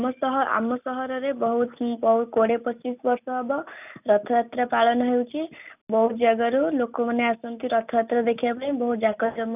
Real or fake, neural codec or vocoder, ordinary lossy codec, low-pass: real; none; none; 3.6 kHz